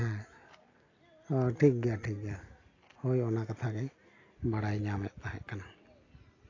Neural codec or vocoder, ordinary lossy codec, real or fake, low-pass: none; AAC, 32 kbps; real; 7.2 kHz